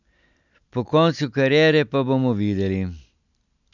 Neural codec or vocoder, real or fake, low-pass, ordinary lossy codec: none; real; 7.2 kHz; none